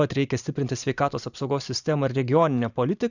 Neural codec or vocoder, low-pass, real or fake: vocoder, 44.1 kHz, 128 mel bands every 512 samples, BigVGAN v2; 7.2 kHz; fake